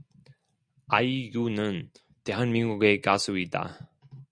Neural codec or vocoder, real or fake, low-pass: none; real; 9.9 kHz